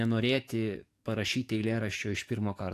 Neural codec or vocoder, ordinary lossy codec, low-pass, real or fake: codec, 44.1 kHz, 7.8 kbps, DAC; AAC, 64 kbps; 14.4 kHz; fake